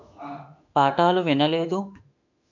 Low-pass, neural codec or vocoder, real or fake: 7.2 kHz; autoencoder, 48 kHz, 32 numbers a frame, DAC-VAE, trained on Japanese speech; fake